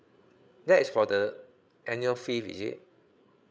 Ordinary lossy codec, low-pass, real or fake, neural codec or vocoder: none; none; fake; codec, 16 kHz, 8 kbps, FreqCodec, larger model